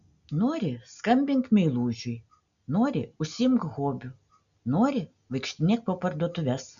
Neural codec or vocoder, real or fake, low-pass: none; real; 7.2 kHz